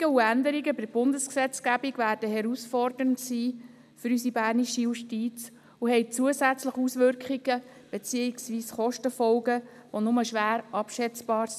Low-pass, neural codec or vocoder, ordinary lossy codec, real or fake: 14.4 kHz; none; none; real